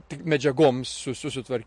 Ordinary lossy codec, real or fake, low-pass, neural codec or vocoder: MP3, 48 kbps; fake; 10.8 kHz; vocoder, 44.1 kHz, 128 mel bands every 512 samples, BigVGAN v2